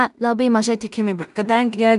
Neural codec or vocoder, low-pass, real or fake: codec, 16 kHz in and 24 kHz out, 0.4 kbps, LongCat-Audio-Codec, two codebook decoder; 10.8 kHz; fake